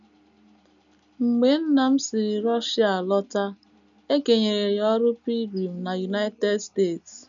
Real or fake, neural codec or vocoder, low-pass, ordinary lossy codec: real; none; 7.2 kHz; none